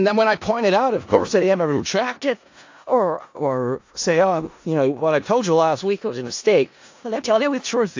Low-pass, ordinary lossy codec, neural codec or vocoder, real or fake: 7.2 kHz; AAC, 48 kbps; codec, 16 kHz in and 24 kHz out, 0.4 kbps, LongCat-Audio-Codec, four codebook decoder; fake